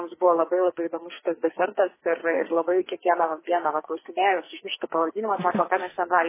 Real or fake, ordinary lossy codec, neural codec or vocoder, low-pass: fake; MP3, 16 kbps; codec, 44.1 kHz, 2.6 kbps, SNAC; 3.6 kHz